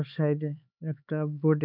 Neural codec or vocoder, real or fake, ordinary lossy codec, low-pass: codec, 16 kHz, 4 kbps, X-Codec, HuBERT features, trained on LibriSpeech; fake; none; 5.4 kHz